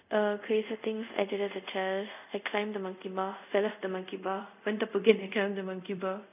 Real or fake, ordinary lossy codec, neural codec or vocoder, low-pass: fake; none; codec, 24 kHz, 0.5 kbps, DualCodec; 3.6 kHz